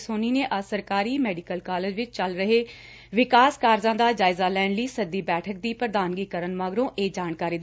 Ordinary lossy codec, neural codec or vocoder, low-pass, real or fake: none; none; none; real